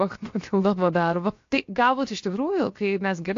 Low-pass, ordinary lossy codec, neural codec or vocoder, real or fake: 7.2 kHz; AAC, 48 kbps; codec, 16 kHz, 0.7 kbps, FocalCodec; fake